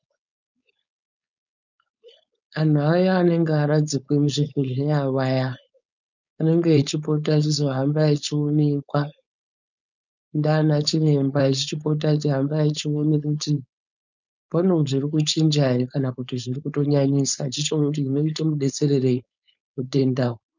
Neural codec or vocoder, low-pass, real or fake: codec, 16 kHz, 4.8 kbps, FACodec; 7.2 kHz; fake